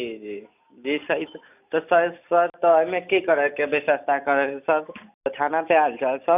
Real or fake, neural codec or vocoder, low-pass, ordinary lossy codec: real; none; 3.6 kHz; none